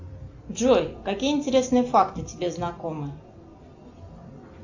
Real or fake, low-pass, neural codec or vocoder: real; 7.2 kHz; none